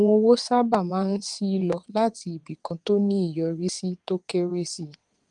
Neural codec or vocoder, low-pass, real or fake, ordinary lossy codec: vocoder, 22.05 kHz, 80 mel bands, WaveNeXt; 9.9 kHz; fake; Opus, 24 kbps